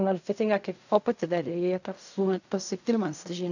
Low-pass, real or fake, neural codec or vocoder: 7.2 kHz; fake; codec, 16 kHz in and 24 kHz out, 0.4 kbps, LongCat-Audio-Codec, fine tuned four codebook decoder